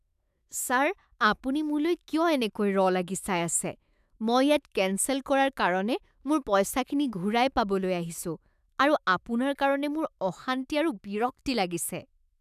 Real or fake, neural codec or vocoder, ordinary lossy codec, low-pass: fake; autoencoder, 48 kHz, 128 numbers a frame, DAC-VAE, trained on Japanese speech; none; 14.4 kHz